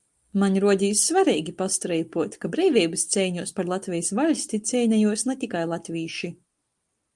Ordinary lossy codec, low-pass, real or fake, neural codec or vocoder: Opus, 32 kbps; 10.8 kHz; real; none